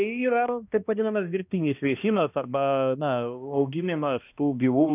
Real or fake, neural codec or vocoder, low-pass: fake; codec, 16 kHz, 1 kbps, X-Codec, HuBERT features, trained on balanced general audio; 3.6 kHz